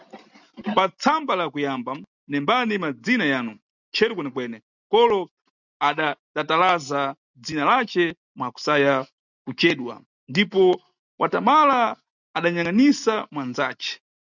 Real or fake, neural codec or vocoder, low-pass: real; none; 7.2 kHz